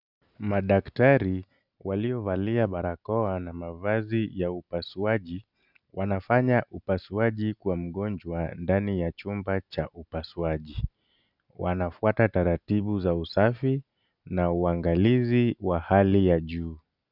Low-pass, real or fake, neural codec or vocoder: 5.4 kHz; real; none